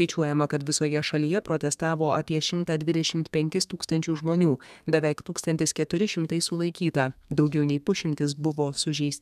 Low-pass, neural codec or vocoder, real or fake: 14.4 kHz; codec, 32 kHz, 1.9 kbps, SNAC; fake